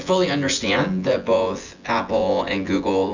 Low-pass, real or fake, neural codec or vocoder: 7.2 kHz; fake; vocoder, 24 kHz, 100 mel bands, Vocos